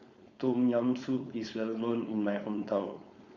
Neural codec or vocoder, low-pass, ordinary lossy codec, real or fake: codec, 16 kHz, 4.8 kbps, FACodec; 7.2 kHz; Opus, 64 kbps; fake